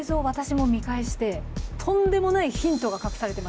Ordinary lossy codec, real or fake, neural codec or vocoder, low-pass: none; real; none; none